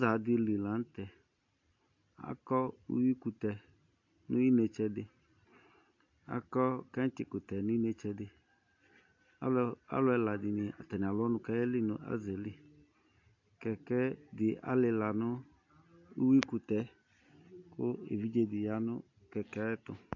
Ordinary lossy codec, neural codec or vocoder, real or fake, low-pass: AAC, 48 kbps; none; real; 7.2 kHz